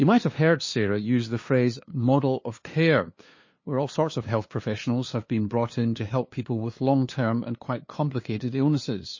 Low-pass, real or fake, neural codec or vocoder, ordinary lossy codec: 7.2 kHz; fake; codec, 16 kHz, 2 kbps, FunCodec, trained on LibriTTS, 25 frames a second; MP3, 32 kbps